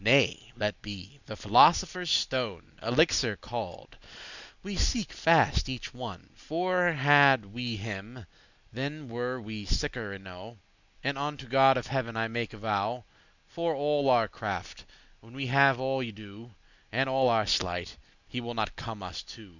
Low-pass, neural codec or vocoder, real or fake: 7.2 kHz; none; real